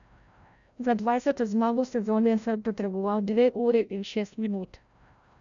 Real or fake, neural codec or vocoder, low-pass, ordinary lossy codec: fake; codec, 16 kHz, 0.5 kbps, FreqCodec, larger model; 7.2 kHz; none